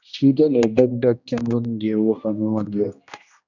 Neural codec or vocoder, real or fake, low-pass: codec, 16 kHz, 1 kbps, X-Codec, HuBERT features, trained on general audio; fake; 7.2 kHz